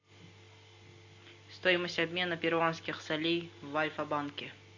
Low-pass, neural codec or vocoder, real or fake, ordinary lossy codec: 7.2 kHz; none; real; AAC, 48 kbps